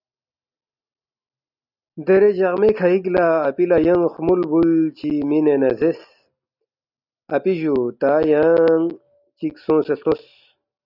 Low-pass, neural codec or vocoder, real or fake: 5.4 kHz; none; real